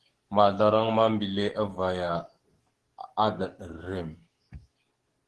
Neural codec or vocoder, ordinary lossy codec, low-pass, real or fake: codec, 44.1 kHz, 7.8 kbps, DAC; Opus, 16 kbps; 10.8 kHz; fake